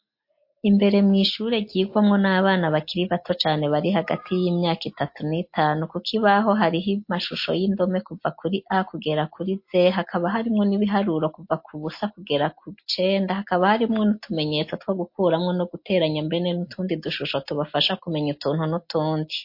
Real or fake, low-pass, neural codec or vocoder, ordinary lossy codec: real; 5.4 kHz; none; MP3, 32 kbps